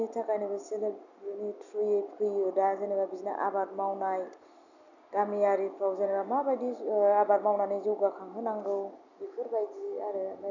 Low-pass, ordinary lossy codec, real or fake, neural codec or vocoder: 7.2 kHz; none; real; none